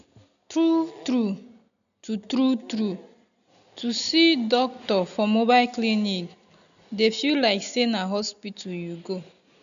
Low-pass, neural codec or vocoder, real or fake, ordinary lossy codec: 7.2 kHz; none; real; none